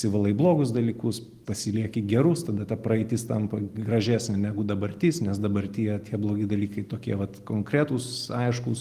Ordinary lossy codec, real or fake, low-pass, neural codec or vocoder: Opus, 24 kbps; real; 14.4 kHz; none